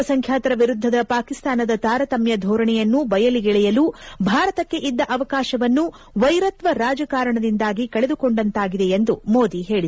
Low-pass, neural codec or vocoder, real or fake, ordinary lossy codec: none; none; real; none